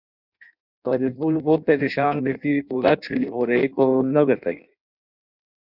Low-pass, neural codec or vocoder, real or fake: 5.4 kHz; codec, 16 kHz in and 24 kHz out, 0.6 kbps, FireRedTTS-2 codec; fake